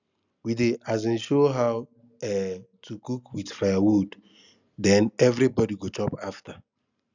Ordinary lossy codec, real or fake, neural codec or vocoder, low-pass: none; real; none; 7.2 kHz